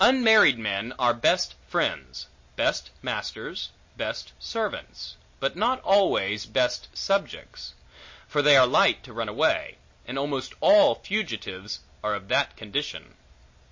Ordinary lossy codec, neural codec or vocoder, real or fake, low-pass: MP3, 32 kbps; none; real; 7.2 kHz